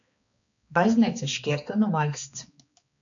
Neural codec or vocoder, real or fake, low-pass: codec, 16 kHz, 2 kbps, X-Codec, HuBERT features, trained on balanced general audio; fake; 7.2 kHz